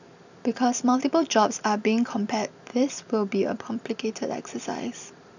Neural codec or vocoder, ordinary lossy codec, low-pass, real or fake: vocoder, 44.1 kHz, 128 mel bands every 256 samples, BigVGAN v2; none; 7.2 kHz; fake